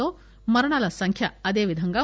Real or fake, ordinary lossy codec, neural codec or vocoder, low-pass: real; none; none; none